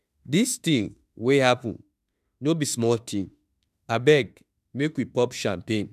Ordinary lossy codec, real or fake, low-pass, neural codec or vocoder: none; fake; 14.4 kHz; autoencoder, 48 kHz, 32 numbers a frame, DAC-VAE, trained on Japanese speech